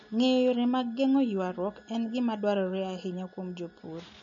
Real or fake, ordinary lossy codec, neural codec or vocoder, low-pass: real; MP3, 48 kbps; none; 7.2 kHz